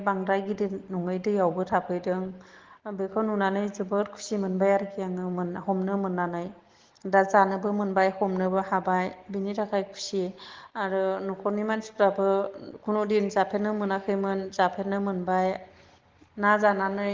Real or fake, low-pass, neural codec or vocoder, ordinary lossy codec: real; 7.2 kHz; none; Opus, 16 kbps